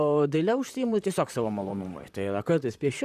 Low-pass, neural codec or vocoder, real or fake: 14.4 kHz; vocoder, 44.1 kHz, 128 mel bands, Pupu-Vocoder; fake